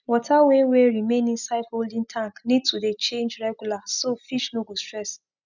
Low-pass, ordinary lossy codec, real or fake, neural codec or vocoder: 7.2 kHz; none; real; none